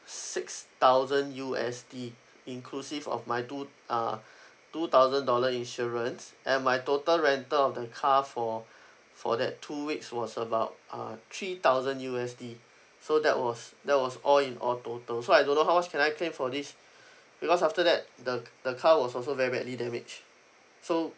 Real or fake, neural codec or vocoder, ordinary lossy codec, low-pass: real; none; none; none